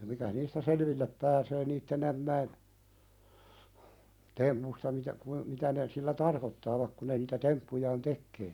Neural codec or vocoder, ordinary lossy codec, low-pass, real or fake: vocoder, 44.1 kHz, 128 mel bands every 256 samples, BigVGAN v2; none; 19.8 kHz; fake